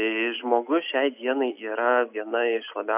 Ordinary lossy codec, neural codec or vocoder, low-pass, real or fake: AAC, 32 kbps; none; 3.6 kHz; real